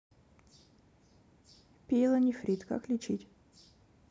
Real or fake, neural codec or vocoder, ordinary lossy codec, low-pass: real; none; none; none